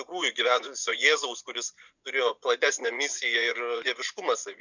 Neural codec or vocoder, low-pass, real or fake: vocoder, 24 kHz, 100 mel bands, Vocos; 7.2 kHz; fake